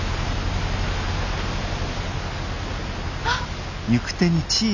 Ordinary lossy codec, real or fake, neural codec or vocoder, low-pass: none; real; none; 7.2 kHz